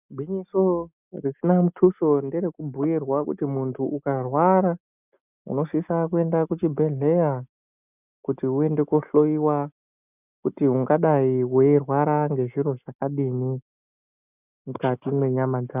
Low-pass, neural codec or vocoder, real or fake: 3.6 kHz; none; real